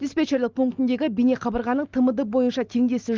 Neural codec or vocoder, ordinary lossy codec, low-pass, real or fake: none; Opus, 32 kbps; 7.2 kHz; real